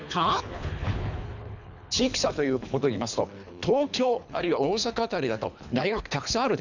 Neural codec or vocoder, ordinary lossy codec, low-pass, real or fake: codec, 24 kHz, 3 kbps, HILCodec; none; 7.2 kHz; fake